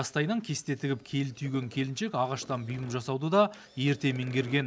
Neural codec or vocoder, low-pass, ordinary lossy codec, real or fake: none; none; none; real